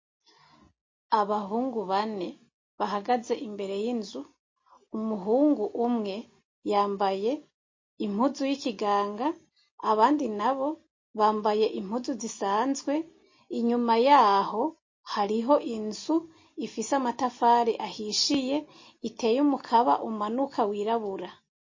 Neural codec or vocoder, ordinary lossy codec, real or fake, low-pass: none; MP3, 32 kbps; real; 7.2 kHz